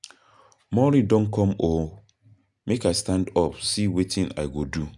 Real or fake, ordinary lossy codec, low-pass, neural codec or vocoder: real; none; 10.8 kHz; none